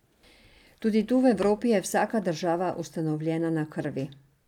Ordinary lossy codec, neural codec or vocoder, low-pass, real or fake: none; none; 19.8 kHz; real